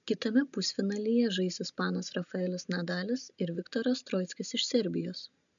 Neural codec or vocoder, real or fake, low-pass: none; real; 7.2 kHz